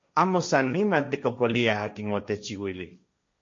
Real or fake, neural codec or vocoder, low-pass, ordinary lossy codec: fake; codec, 16 kHz, 1.1 kbps, Voila-Tokenizer; 7.2 kHz; MP3, 48 kbps